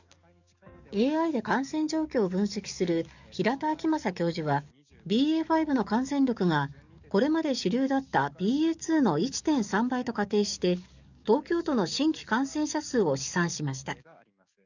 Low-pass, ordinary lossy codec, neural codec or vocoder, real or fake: 7.2 kHz; none; codec, 44.1 kHz, 7.8 kbps, DAC; fake